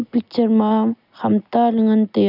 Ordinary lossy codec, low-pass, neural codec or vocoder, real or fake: none; 5.4 kHz; none; real